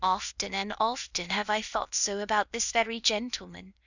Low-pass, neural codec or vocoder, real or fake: 7.2 kHz; codec, 16 kHz, 0.8 kbps, ZipCodec; fake